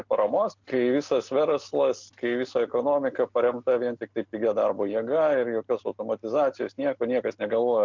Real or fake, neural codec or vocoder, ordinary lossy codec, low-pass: real; none; MP3, 64 kbps; 7.2 kHz